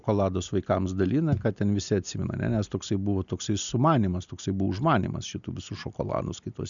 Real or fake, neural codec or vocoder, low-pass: real; none; 7.2 kHz